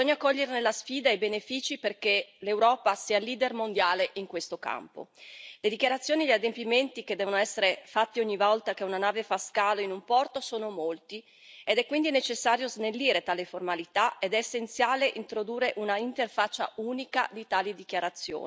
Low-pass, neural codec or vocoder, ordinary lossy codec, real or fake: none; none; none; real